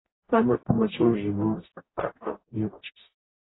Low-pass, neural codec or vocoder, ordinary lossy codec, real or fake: 7.2 kHz; codec, 44.1 kHz, 0.9 kbps, DAC; AAC, 16 kbps; fake